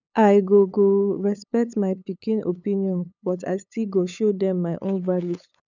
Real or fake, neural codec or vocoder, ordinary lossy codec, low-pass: fake; codec, 16 kHz, 8 kbps, FunCodec, trained on LibriTTS, 25 frames a second; none; 7.2 kHz